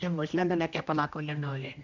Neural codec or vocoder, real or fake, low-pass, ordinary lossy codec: codec, 16 kHz, 1 kbps, X-Codec, HuBERT features, trained on general audio; fake; 7.2 kHz; none